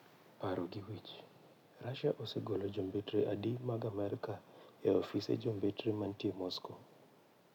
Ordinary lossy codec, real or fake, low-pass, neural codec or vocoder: none; real; 19.8 kHz; none